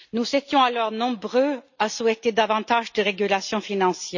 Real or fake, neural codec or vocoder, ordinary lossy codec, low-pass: real; none; none; 7.2 kHz